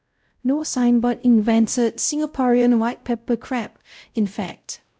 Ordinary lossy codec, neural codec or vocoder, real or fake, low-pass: none; codec, 16 kHz, 0.5 kbps, X-Codec, WavLM features, trained on Multilingual LibriSpeech; fake; none